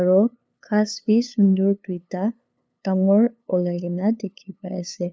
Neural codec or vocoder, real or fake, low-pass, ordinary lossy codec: codec, 16 kHz, 2 kbps, FunCodec, trained on LibriTTS, 25 frames a second; fake; none; none